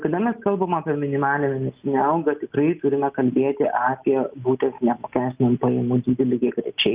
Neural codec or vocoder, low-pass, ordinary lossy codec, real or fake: none; 3.6 kHz; Opus, 24 kbps; real